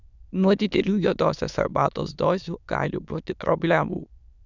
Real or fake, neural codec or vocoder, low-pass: fake; autoencoder, 22.05 kHz, a latent of 192 numbers a frame, VITS, trained on many speakers; 7.2 kHz